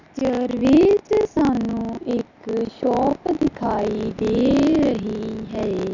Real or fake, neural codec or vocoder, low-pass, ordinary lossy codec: real; none; 7.2 kHz; none